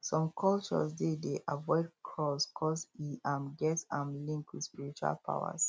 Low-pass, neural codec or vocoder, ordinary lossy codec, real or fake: none; none; none; real